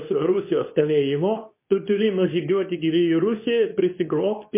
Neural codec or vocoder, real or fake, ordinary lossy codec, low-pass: codec, 24 kHz, 0.9 kbps, WavTokenizer, medium speech release version 2; fake; MP3, 24 kbps; 3.6 kHz